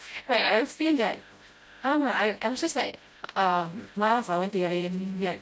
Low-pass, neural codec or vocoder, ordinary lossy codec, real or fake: none; codec, 16 kHz, 0.5 kbps, FreqCodec, smaller model; none; fake